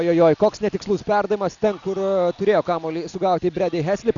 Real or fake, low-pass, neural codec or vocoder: real; 7.2 kHz; none